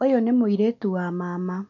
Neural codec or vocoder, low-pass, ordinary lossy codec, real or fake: none; 7.2 kHz; none; real